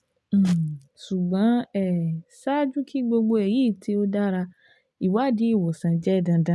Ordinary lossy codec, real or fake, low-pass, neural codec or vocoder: none; real; none; none